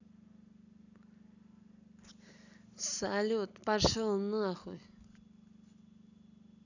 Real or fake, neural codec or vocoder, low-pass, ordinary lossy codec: real; none; 7.2 kHz; none